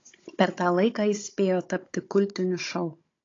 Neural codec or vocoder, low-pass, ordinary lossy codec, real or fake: codec, 16 kHz, 16 kbps, FunCodec, trained on Chinese and English, 50 frames a second; 7.2 kHz; AAC, 32 kbps; fake